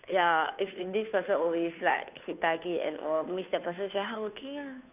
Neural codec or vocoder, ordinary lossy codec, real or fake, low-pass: codec, 16 kHz, 2 kbps, FunCodec, trained on Chinese and English, 25 frames a second; none; fake; 3.6 kHz